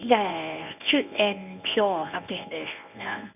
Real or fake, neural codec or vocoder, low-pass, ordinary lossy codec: fake; codec, 24 kHz, 0.9 kbps, WavTokenizer, medium speech release version 1; 3.6 kHz; none